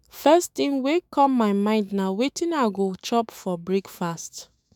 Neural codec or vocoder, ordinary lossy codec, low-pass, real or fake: autoencoder, 48 kHz, 128 numbers a frame, DAC-VAE, trained on Japanese speech; none; 19.8 kHz; fake